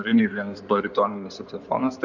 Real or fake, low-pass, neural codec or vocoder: fake; 7.2 kHz; codec, 16 kHz in and 24 kHz out, 2.2 kbps, FireRedTTS-2 codec